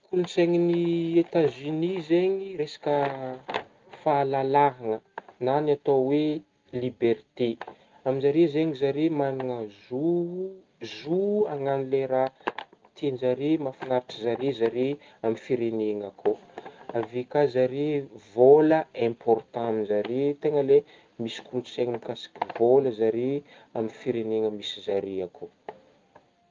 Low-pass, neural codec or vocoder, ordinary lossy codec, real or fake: 7.2 kHz; none; Opus, 24 kbps; real